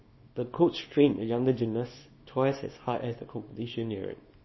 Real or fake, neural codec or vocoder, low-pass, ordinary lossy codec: fake; codec, 24 kHz, 0.9 kbps, WavTokenizer, small release; 7.2 kHz; MP3, 24 kbps